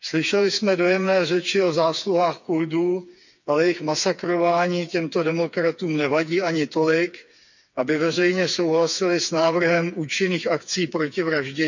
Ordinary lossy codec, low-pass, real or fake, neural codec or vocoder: none; 7.2 kHz; fake; codec, 16 kHz, 4 kbps, FreqCodec, smaller model